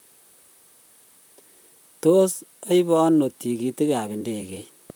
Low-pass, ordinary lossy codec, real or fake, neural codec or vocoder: none; none; fake; vocoder, 44.1 kHz, 128 mel bands, Pupu-Vocoder